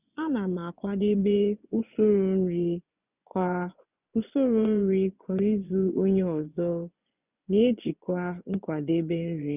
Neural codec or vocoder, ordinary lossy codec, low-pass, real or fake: vocoder, 24 kHz, 100 mel bands, Vocos; none; 3.6 kHz; fake